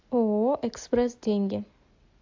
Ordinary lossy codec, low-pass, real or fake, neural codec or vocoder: AAC, 48 kbps; 7.2 kHz; real; none